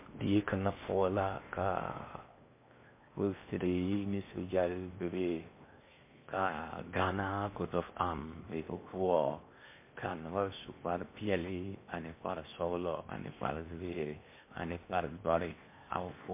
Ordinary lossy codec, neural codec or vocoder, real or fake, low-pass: MP3, 24 kbps; codec, 16 kHz in and 24 kHz out, 0.8 kbps, FocalCodec, streaming, 65536 codes; fake; 3.6 kHz